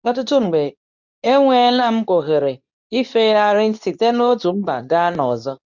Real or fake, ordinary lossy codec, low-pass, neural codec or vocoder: fake; none; 7.2 kHz; codec, 24 kHz, 0.9 kbps, WavTokenizer, medium speech release version 2